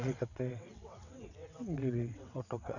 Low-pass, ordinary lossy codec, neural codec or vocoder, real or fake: 7.2 kHz; none; vocoder, 22.05 kHz, 80 mel bands, WaveNeXt; fake